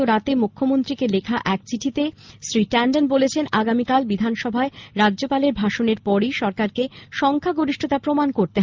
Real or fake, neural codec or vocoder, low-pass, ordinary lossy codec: real; none; 7.2 kHz; Opus, 24 kbps